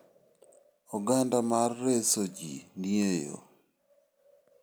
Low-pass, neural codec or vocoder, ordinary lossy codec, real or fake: none; none; none; real